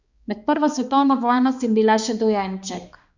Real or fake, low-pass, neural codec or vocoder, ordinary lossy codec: fake; 7.2 kHz; codec, 16 kHz, 2 kbps, X-Codec, HuBERT features, trained on balanced general audio; Opus, 64 kbps